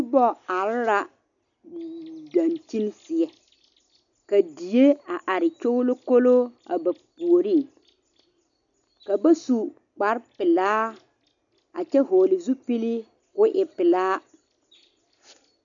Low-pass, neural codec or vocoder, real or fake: 7.2 kHz; none; real